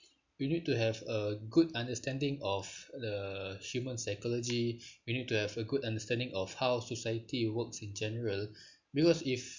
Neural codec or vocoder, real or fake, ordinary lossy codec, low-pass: none; real; MP3, 64 kbps; 7.2 kHz